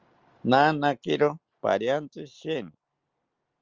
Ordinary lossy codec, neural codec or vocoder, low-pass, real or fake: Opus, 32 kbps; none; 7.2 kHz; real